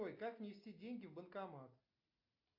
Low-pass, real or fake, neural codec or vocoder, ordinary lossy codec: 5.4 kHz; real; none; AAC, 48 kbps